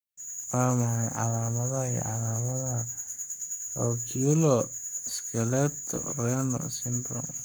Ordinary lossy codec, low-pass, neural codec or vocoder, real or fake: none; none; codec, 44.1 kHz, 7.8 kbps, Pupu-Codec; fake